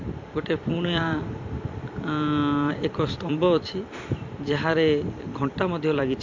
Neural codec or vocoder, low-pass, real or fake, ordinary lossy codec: vocoder, 44.1 kHz, 128 mel bands every 256 samples, BigVGAN v2; 7.2 kHz; fake; MP3, 48 kbps